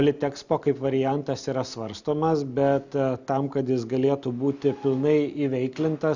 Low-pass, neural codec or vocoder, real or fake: 7.2 kHz; none; real